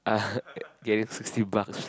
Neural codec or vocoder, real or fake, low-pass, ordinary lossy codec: none; real; none; none